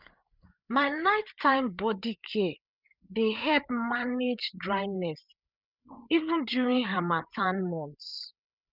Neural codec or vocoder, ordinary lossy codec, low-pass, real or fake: codec, 16 kHz, 8 kbps, FreqCodec, larger model; none; 5.4 kHz; fake